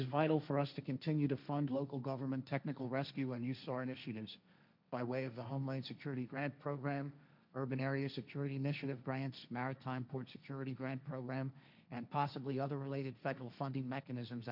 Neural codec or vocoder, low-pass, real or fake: codec, 16 kHz, 1.1 kbps, Voila-Tokenizer; 5.4 kHz; fake